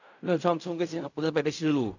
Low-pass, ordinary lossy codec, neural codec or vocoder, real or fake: 7.2 kHz; none; codec, 16 kHz in and 24 kHz out, 0.4 kbps, LongCat-Audio-Codec, fine tuned four codebook decoder; fake